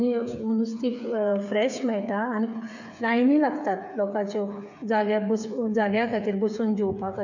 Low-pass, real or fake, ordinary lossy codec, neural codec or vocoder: 7.2 kHz; fake; none; codec, 16 kHz, 8 kbps, FreqCodec, smaller model